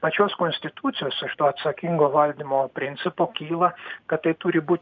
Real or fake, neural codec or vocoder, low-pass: real; none; 7.2 kHz